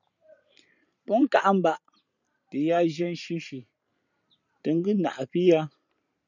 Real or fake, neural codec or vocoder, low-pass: fake; vocoder, 44.1 kHz, 128 mel bands every 512 samples, BigVGAN v2; 7.2 kHz